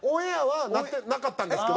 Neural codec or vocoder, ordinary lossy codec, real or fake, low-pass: none; none; real; none